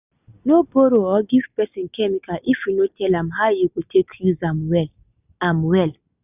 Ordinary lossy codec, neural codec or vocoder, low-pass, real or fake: none; none; 3.6 kHz; real